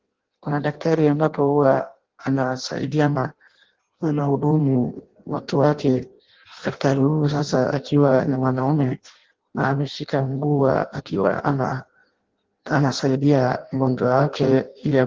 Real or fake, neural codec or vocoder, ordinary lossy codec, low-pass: fake; codec, 16 kHz in and 24 kHz out, 0.6 kbps, FireRedTTS-2 codec; Opus, 16 kbps; 7.2 kHz